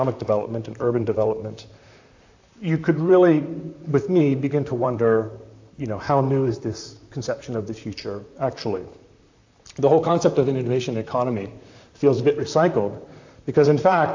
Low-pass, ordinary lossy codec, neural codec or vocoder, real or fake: 7.2 kHz; AAC, 48 kbps; vocoder, 44.1 kHz, 128 mel bands, Pupu-Vocoder; fake